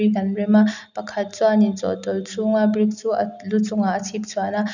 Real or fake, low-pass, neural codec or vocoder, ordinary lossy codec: real; 7.2 kHz; none; none